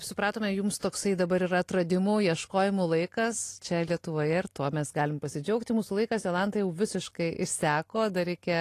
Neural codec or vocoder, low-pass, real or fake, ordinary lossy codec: none; 14.4 kHz; real; AAC, 48 kbps